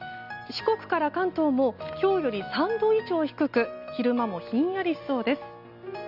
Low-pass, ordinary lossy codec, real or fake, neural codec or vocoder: 5.4 kHz; none; real; none